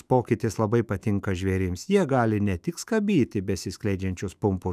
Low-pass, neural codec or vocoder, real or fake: 14.4 kHz; autoencoder, 48 kHz, 128 numbers a frame, DAC-VAE, trained on Japanese speech; fake